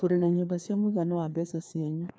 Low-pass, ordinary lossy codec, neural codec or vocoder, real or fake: none; none; codec, 16 kHz, 2 kbps, FreqCodec, larger model; fake